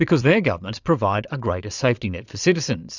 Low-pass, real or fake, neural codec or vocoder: 7.2 kHz; fake; vocoder, 22.05 kHz, 80 mel bands, Vocos